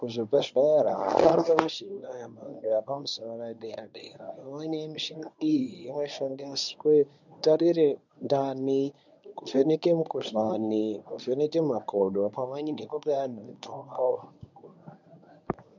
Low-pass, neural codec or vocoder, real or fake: 7.2 kHz; codec, 24 kHz, 0.9 kbps, WavTokenizer, medium speech release version 1; fake